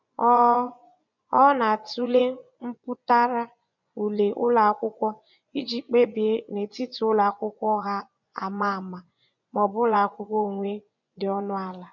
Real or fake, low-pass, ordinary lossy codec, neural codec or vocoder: fake; 7.2 kHz; none; vocoder, 24 kHz, 100 mel bands, Vocos